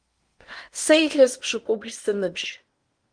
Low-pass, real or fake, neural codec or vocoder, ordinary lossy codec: 9.9 kHz; fake; codec, 16 kHz in and 24 kHz out, 0.8 kbps, FocalCodec, streaming, 65536 codes; Opus, 24 kbps